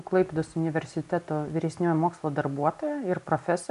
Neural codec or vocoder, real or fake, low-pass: none; real; 10.8 kHz